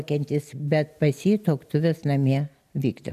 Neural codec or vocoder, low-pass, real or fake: none; 14.4 kHz; real